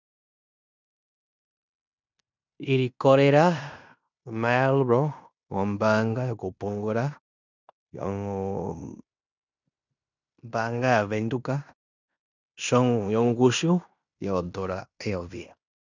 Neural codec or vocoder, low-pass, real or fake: codec, 16 kHz in and 24 kHz out, 0.9 kbps, LongCat-Audio-Codec, fine tuned four codebook decoder; 7.2 kHz; fake